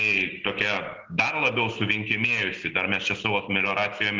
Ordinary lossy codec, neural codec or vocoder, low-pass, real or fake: Opus, 16 kbps; none; 7.2 kHz; real